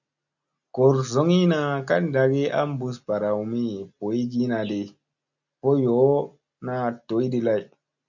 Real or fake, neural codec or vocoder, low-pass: real; none; 7.2 kHz